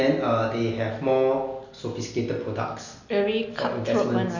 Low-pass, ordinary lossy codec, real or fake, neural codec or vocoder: 7.2 kHz; none; real; none